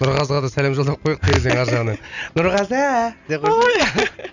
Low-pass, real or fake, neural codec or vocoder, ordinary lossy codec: 7.2 kHz; real; none; none